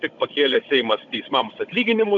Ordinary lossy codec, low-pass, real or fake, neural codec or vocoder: AAC, 64 kbps; 7.2 kHz; fake; codec, 16 kHz, 16 kbps, FunCodec, trained on Chinese and English, 50 frames a second